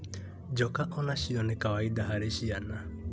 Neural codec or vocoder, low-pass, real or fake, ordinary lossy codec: none; none; real; none